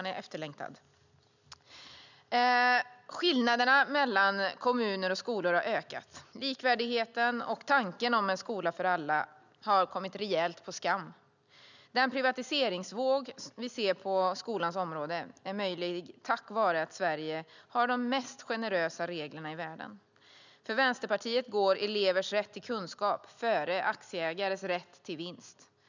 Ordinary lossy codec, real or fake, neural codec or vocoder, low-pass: none; real; none; 7.2 kHz